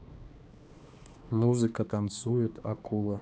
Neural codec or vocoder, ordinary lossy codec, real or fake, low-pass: codec, 16 kHz, 2 kbps, X-Codec, HuBERT features, trained on balanced general audio; none; fake; none